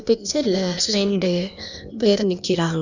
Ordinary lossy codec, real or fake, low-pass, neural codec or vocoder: none; fake; 7.2 kHz; codec, 16 kHz, 0.8 kbps, ZipCodec